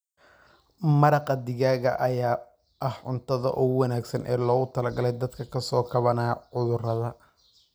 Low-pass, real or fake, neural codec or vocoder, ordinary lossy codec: none; real; none; none